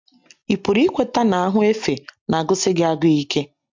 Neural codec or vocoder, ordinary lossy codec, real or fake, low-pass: none; MP3, 64 kbps; real; 7.2 kHz